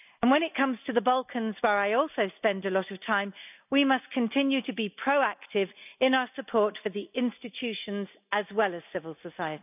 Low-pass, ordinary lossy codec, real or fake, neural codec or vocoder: 3.6 kHz; none; real; none